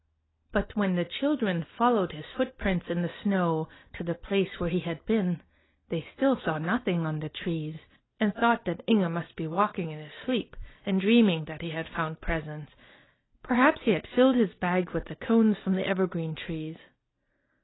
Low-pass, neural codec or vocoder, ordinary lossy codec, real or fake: 7.2 kHz; none; AAC, 16 kbps; real